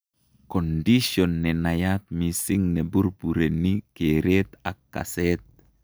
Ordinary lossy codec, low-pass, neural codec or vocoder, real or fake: none; none; none; real